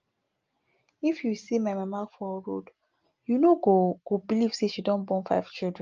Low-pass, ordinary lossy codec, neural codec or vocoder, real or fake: 7.2 kHz; Opus, 24 kbps; none; real